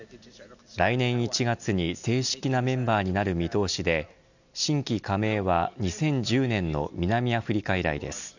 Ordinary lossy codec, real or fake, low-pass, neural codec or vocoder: none; real; 7.2 kHz; none